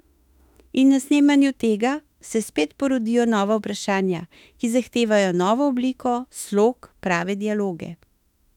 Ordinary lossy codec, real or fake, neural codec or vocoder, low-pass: none; fake; autoencoder, 48 kHz, 32 numbers a frame, DAC-VAE, trained on Japanese speech; 19.8 kHz